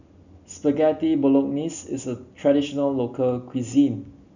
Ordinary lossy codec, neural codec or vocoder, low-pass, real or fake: none; none; 7.2 kHz; real